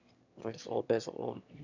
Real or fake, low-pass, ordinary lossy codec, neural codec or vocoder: fake; 7.2 kHz; none; autoencoder, 22.05 kHz, a latent of 192 numbers a frame, VITS, trained on one speaker